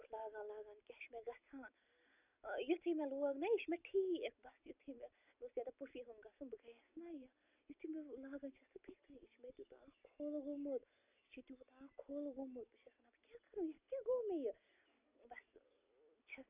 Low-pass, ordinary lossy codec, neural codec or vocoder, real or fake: 3.6 kHz; none; none; real